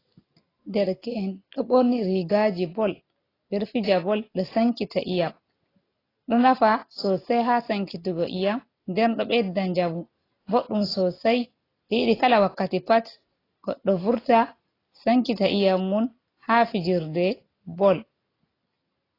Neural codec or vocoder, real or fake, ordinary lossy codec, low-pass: none; real; AAC, 24 kbps; 5.4 kHz